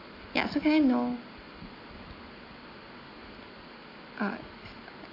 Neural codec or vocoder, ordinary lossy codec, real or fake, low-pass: none; AAC, 32 kbps; real; 5.4 kHz